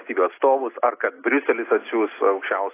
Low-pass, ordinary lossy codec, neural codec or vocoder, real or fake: 3.6 kHz; AAC, 24 kbps; none; real